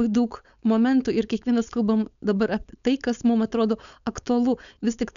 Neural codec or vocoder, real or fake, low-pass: codec, 16 kHz, 4.8 kbps, FACodec; fake; 7.2 kHz